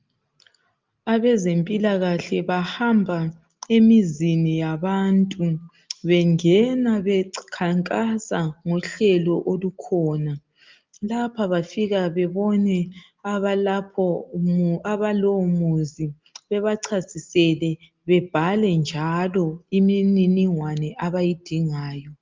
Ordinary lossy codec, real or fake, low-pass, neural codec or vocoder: Opus, 24 kbps; real; 7.2 kHz; none